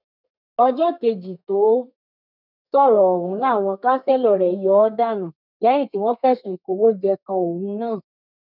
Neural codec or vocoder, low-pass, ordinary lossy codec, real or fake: codec, 32 kHz, 1.9 kbps, SNAC; 5.4 kHz; none; fake